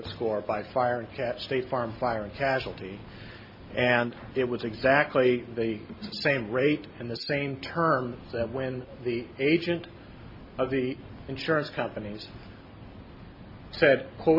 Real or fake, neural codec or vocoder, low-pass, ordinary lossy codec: real; none; 5.4 kHz; MP3, 32 kbps